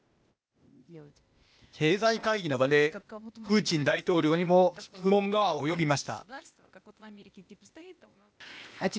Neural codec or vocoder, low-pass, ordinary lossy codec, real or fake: codec, 16 kHz, 0.8 kbps, ZipCodec; none; none; fake